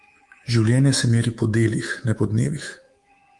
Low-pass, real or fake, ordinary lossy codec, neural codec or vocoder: 10.8 kHz; fake; Opus, 24 kbps; codec, 24 kHz, 3.1 kbps, DualCodec